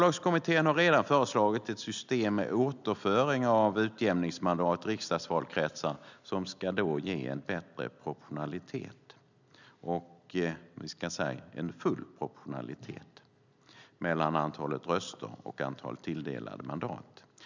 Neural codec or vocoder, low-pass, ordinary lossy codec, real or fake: none; 7.2 kHz; none; real